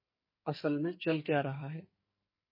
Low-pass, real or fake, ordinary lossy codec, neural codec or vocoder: 5.4 kHz; fake; MP3, 24 kbps; codec, 44.1 kHz, 2.6 kbps, SNAC